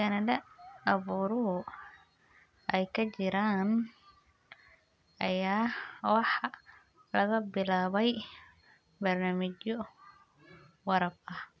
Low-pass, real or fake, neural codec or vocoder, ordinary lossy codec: none; real; none; none